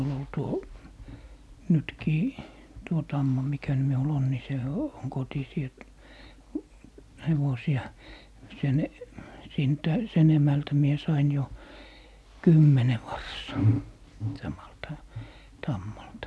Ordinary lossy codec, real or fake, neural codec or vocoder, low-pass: none; real; none; none